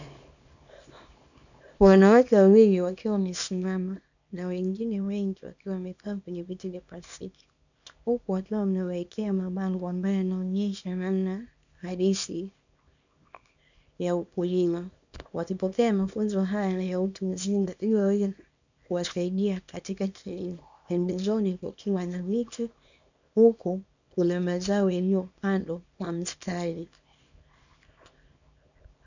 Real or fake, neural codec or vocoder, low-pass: fake; codec, 24 kHz, 0.9 kbps, WavTokenizer, small release; 7.2 kHz